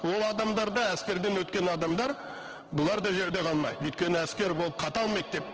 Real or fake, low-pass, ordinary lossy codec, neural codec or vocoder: fake; 7.2 kHz; Opus, 16 kbps; codec, 16 kHz in and 24 kHz out, 1 kbps, XY-Tokenizer